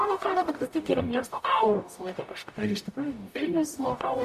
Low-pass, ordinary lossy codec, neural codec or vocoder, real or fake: 14.4 kHz; MP3, 64 kbps; codec, 44.1 kHz, 0.9 kbps, DAC; fake